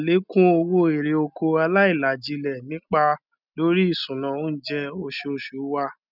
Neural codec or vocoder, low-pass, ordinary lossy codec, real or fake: none; 5.4 kHz; none; real